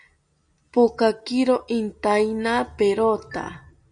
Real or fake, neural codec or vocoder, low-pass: real; none; 9.9 kHz